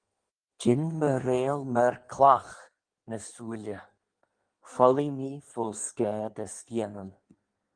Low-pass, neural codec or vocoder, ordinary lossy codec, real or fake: 9.9 kHz; codec, 16 kHz in and 24 kHz out, 1.1 kbps, FireRedTTS-2 codec; Opus, 24 kbps; fake